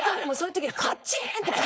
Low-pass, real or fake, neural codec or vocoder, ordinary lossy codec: none; fake; codec, 16 kHz, 4.8 kbps, FACodec; none